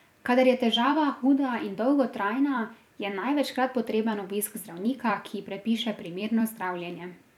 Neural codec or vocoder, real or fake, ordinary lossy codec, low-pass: vocoder, 44.1 kHz, 128 mel bands every 256 samples, BigVGAN v2; fake; none; 19.8 kHz